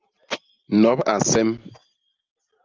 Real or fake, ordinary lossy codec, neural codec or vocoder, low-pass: real; Opus, 24 kbps; none; 7.2 kHz